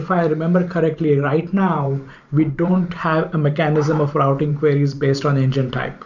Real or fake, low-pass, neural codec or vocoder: fake; 7.2 kHz; vocoder, 44.1 kHz, 128 mel bands every 512 samples, BigVGAN v2